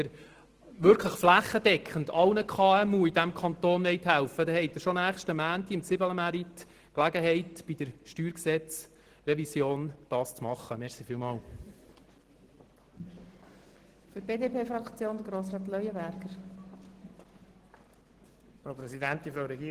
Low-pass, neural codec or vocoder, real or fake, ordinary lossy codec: 14.4 kHz; none; real; Opus, 16 kbps